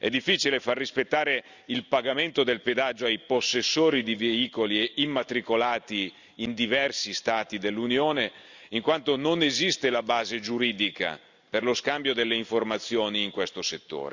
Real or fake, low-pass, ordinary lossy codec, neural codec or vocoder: real; 7.2 kHz; Opus, 64 kbps; none